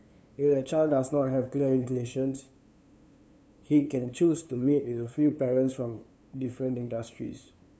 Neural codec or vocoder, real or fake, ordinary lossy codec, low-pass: codec, 16 kHz, 2 kbps, FunCodec, trained on LibriTTS, 25 frames a second; fake; none; none